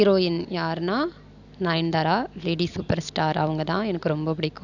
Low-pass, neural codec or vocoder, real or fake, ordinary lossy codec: 7.2 kHz; none; real; none